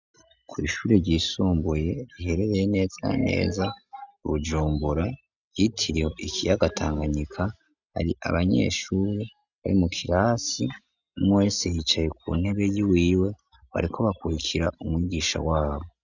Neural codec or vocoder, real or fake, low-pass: none; real; 7.2 kHz